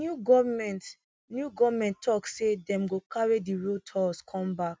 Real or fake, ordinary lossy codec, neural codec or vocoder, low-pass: real; none; none; none